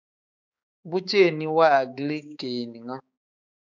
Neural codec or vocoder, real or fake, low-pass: codec, 16 kHz, 4 kbps, X-Codec, HuBERT features, trained on balanced general audio; fake; 7.2 kHz